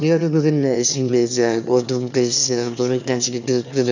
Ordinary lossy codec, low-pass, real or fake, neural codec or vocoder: none; 7.2 kHz; fake; autoencoder, 22.05 kHz, a latent of 192 numbers a frame, VITS, trained on one speaker